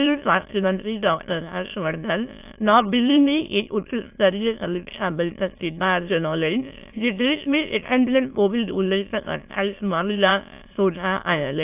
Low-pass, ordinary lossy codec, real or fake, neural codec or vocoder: 3.6 kHz; AAC, 32 kbps; fake; autoencoder, 22.05 kHz, a latent of 192 numbers a frame, VITS, trained on many speakers